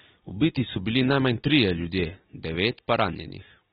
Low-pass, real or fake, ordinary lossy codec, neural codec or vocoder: 7.2 kHz; real; AAC, 16 kbps; none